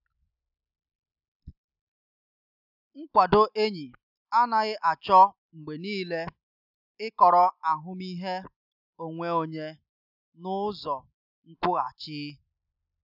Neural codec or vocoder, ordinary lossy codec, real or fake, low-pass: none; none; real; 5.4 kHz